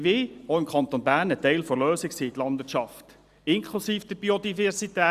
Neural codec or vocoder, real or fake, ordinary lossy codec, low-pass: none; real; Opus, 64 kbps; 14.4 kHz